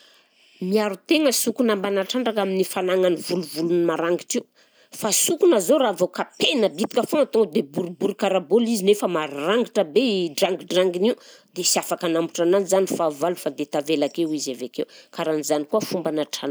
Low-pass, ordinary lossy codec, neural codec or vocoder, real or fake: none; none; none; real